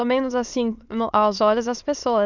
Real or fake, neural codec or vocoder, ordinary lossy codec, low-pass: fake; autoencoder, 22.05 kHz, a latent of 192 numbers a frame, VITS, trained on many speakers; none; 7.2 kHz